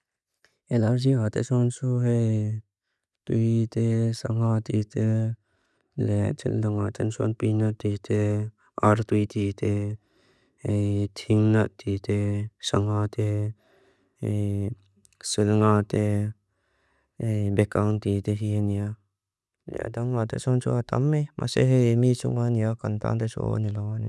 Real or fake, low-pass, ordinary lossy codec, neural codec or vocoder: real; none; none; none